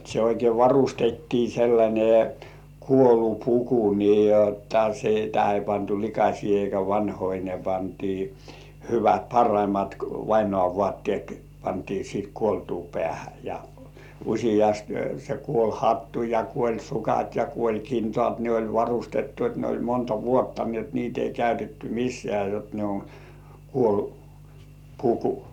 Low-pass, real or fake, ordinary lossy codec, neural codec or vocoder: 19.8 kHz; real; none; none